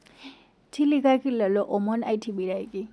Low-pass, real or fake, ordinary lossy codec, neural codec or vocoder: none; real; none; none